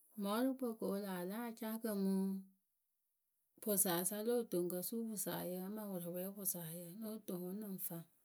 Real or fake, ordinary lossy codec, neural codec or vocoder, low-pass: real; none; none; none